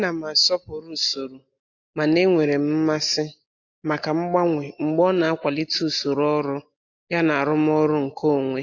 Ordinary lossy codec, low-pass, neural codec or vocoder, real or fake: AAC, 48 kbps; 7.2 kHz; none; real